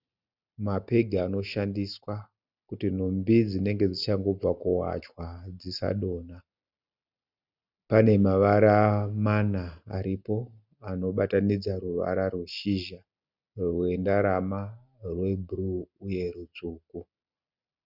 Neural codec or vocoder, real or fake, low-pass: none; real; 5.4 kHz